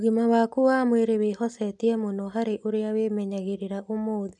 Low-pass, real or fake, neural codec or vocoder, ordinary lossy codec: 10.8 kHz; real; none; none